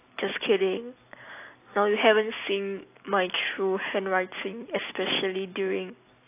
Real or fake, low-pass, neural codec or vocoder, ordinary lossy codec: real; 3.6 kHz; none; AAC, 24 kbps